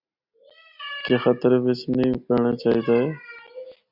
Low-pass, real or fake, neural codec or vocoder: 5.4 kHz; real; none